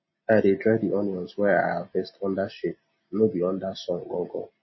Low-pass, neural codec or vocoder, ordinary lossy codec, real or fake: 7.2 kHz; none; MP3, 24 kbps; real